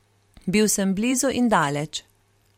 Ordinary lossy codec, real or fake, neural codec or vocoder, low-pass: MP3, 64 kbps; fake; vocoder, 44.1 kHz, 128 mel bands every 512 samples, BigVGAN v2; 19.8 kHz